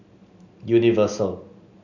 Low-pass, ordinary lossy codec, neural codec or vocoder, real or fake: 7.2 kHz; none; none; real